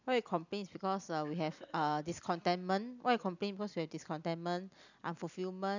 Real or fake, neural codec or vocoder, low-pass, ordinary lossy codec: real; none; 7.2 kHz; none